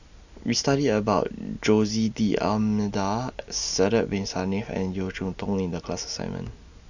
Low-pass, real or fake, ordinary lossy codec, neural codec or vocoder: 7.2 kHz; real; none; none